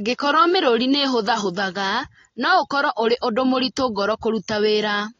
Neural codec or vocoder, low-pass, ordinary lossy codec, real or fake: none; 7.2 kHz; AAC, 32 kbps; real